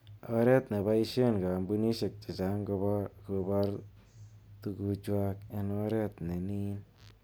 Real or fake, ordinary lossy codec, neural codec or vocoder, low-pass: real; none; none; none